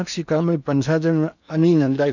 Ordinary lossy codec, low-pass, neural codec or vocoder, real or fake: none; 7.2 kHz; codec, 16 kHz in and 24 kHz out, 0.8 kbps, FocalCodec, streaming, 65536 codes; fake